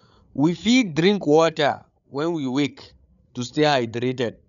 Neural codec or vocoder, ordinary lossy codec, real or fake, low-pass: codec, 16 kHz, 8 kbps, FreqCodec, larger model; none; fake; 7.2 kHz